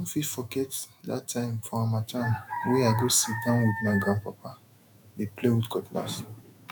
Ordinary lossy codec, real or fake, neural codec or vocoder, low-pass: none; fake; autoencoder, 48 kHz, 128 numbers a frame, DAC-VAE, trained on Japanese speech; none